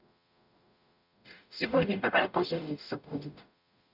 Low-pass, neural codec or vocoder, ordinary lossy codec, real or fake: 5.4 kHz; codec, 44.1 kHz, 0.9 kbps, DAC; none; fake